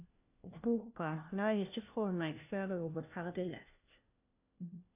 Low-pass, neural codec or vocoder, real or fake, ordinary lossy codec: 3.6 kHz; codec, 16 kHz, 1 kbps, FunCodec, trained on LibriTTS, 50 frames a second; fake; AAC, 24 kbps